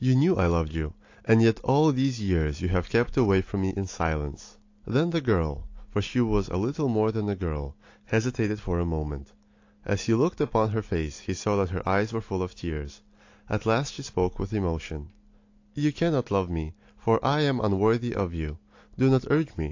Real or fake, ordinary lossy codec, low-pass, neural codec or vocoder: real; AAC, 48 kbps; 7.2 kHz; none